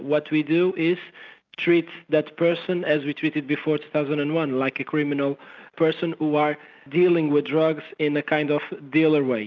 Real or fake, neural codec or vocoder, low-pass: real; none; 7.2 kHz